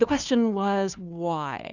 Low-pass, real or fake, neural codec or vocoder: 7.2 kHz; fake; codec, 16 kHz, 2 kbps, FunCodec, trained on Chinese and English, 25 frames a second